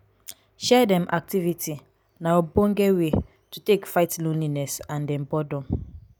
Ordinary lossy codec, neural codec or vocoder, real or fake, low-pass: none; none; real; none